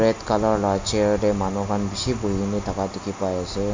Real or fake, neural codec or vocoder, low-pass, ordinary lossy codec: real; none; 7.2 kHz; MP3, 48 kbps